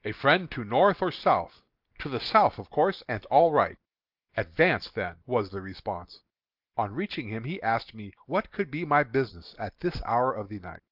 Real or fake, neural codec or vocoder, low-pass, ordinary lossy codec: real; none; 5.4 kHz; Opus, 32 kbps